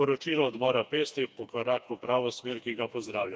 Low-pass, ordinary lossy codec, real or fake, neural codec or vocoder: none; none; fake; codec, 16 kHz, 2 kbps, FreqCodec, smaller model